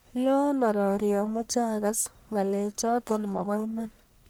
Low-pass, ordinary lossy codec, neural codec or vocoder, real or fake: none; none; codec, 44.1 kHz, 1.7 kbps, Pupu-Codec; fake